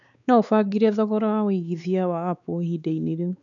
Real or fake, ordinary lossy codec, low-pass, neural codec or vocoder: fake; none; 7.2 kHz; codec, 16 kHz, 4 kbps, X-Codec, WavLM features, trained on Multilingual LibriSpeech